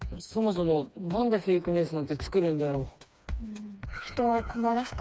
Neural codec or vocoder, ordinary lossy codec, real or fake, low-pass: codec, 16 kHz, 2 kbps, FreqCodec, smaller model; none; fake; none